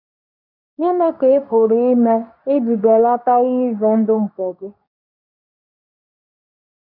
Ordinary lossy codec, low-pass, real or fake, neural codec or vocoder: none; 5.4 kHz; fake; codec, 24 kHz, 0.9 kbps, WavTokenizer, medium speech release version 2